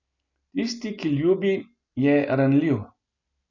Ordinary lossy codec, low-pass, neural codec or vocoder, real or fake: none; 7.2 kHz; none; real